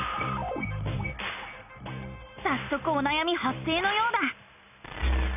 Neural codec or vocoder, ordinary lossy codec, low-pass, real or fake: none; none; 3.6 kHz; real